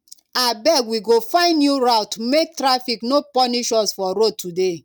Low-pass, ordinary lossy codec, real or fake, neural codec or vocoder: 19.8 kHz; none; real; none